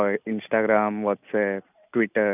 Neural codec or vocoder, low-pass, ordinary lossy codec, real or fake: none; 3.6 kHz; none; real